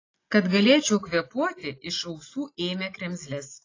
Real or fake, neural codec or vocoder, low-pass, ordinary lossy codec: real; none; 7.2 kHz; AAC, 32 kbps